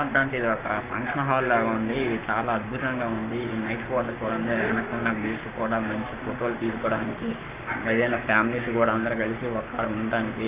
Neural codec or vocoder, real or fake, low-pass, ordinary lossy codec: codec, 44.1 kHz, 7.8 kbps, Pupu-Codec; fake; 3.6 kHz; Opus, 64 kbps